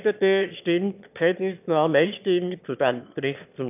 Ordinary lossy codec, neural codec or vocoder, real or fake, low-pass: none; autoencoder, 22.05 kHz, a latent of 192 numbers a frame, VITS, trained on one speaker; fake; 3.6 kHz